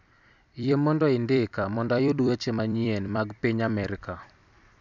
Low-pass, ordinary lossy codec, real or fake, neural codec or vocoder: 7.2 kHz; none; fake; vocoder, 44.1 kHz, 128 mel bands every 256 samples, BigVGAN v2